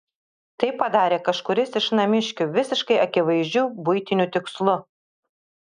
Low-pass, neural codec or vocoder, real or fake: 9.9 kHz; none; real